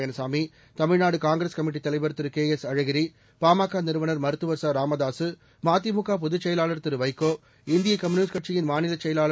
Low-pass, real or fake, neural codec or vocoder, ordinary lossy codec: none; real; none; none